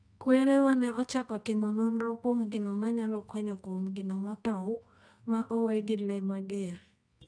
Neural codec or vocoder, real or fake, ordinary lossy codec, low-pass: codec, 24 kHz, 0.9 kbps, WavTokenizer, medium music audio release; fake; none; 9.9 kHz